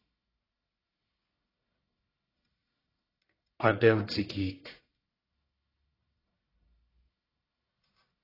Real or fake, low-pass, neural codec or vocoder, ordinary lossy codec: fake; 5.4 kHz; codec, 44.1 kHz, 1.7 kbps, Pupu-Codec; AAC, 24 kbps